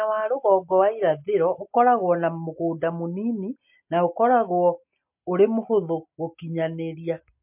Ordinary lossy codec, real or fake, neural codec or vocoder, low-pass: MP3, 32 kbps; real; none; 3.6 kHz